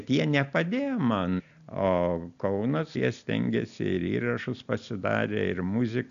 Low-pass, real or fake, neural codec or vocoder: 7.2 kHz; real; none